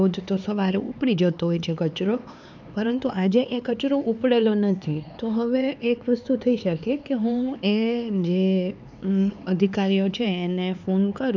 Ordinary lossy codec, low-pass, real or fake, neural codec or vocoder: none; 7.2 kHz; fake; codec, 16 kHz, 4 kbps, X-Codec, HuBERT features, trained on LibriSpeech